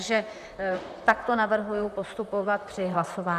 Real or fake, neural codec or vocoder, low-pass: fake; vocoder, 44.1 kHz, 128 mel bands, Pupu-Vocoder; 14.4 kHz